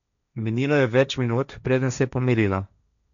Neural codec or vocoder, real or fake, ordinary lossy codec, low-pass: codec, 16 kHz, 1.1 kbps, Voila-Tokenizer; fake; none; 7.2 kHz